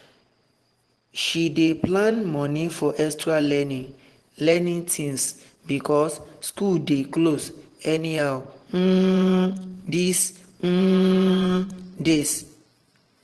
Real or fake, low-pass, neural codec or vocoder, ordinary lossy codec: fake; 10.8 kHz; vocoder, 24 kHz, 100 mel bands, Vocos; Opus, 16 kbps